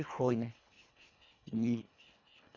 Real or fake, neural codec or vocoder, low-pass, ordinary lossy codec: fake; codec, 24 kHz, 1.5 kbps, HILCodec; 7.2 kHz; none